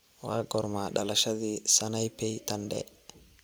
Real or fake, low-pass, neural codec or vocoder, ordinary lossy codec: real; none; none; none